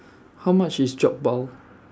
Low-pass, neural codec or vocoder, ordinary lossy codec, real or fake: none; none; none; real